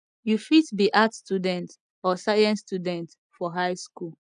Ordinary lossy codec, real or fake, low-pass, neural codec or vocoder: none; real; 9.9 kHz; none